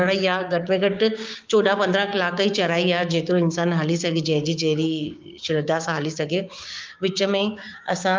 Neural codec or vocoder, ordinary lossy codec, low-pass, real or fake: codec, 16 kHz, 6 kbps, DAC; Opus, 24 kbps; 7.2 kHz; fake